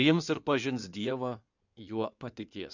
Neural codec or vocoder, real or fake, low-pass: codec, 16 kHz in and 24 kHz out, 2.2 kbps, FireRedTTS-2 codec; fake; 7.2 kHz